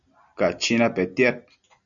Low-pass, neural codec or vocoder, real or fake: 7.2 kHz; none; real